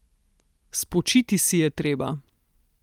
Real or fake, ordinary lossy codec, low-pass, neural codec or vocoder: real; Opus, 32 kbps; 19.8 kHz; none